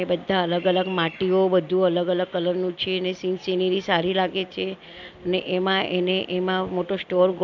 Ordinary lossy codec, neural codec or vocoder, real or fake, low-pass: none; none; real; 7.2 kHz